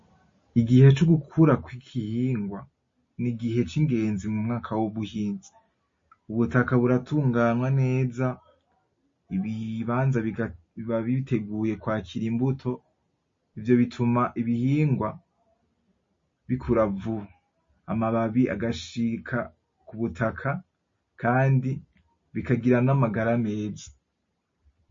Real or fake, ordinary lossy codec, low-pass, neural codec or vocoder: real; MP3, 32 kbps; 7.2 kHz; none